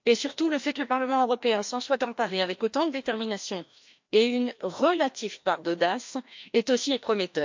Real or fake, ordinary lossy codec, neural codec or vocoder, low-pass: fake; MP3, 48 kbps; codec, 16 kHz, 1 kbps, FreqCodec, larger model; 7.2 kHz